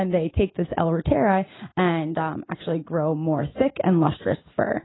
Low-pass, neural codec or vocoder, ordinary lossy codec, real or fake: 7.2 kHz; none; AAC, 16 kbps; real